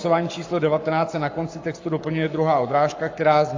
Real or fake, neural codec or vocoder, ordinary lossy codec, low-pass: fake; codec, 44.1 kHz, 7.8 kbps, Pupu-Codec; AAC, 48 kbps; 7.2 kHz